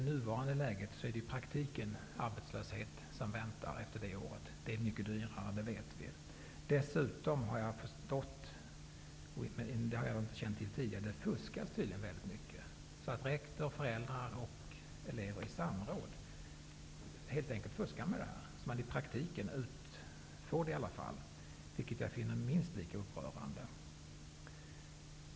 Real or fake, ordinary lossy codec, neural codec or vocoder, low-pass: real; none; none; none